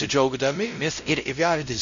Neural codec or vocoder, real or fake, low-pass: codec, 16 kHz, 0.5 kbps, X-Codec, WavLM features, trained on Multilingual LibriSpeech; fake; 7.2 kHz